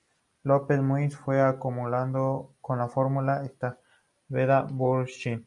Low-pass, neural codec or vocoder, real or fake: 10.8 kHz; none; real